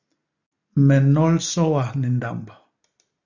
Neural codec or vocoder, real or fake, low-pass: none; real; 7.2 kHz